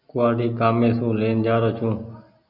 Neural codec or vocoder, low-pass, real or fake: none; 5.4 kHz; real